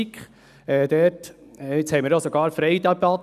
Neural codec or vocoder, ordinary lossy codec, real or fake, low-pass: none; none; real; 14.4 kHz